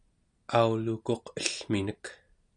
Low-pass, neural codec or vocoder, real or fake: 9.9 kHz; none; real